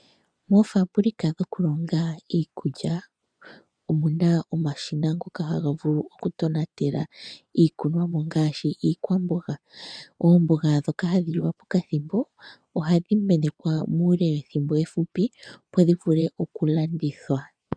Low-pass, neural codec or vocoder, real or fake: 9.9 kHz; vocoder, 24 kHz, 100 mel bands, Vocos; fake